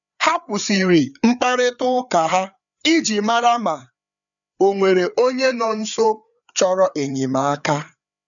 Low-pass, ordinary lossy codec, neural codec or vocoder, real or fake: 7.2 kHz; none; codec, 16 kHz, 4 kbps, FreqCodec, larger model; fake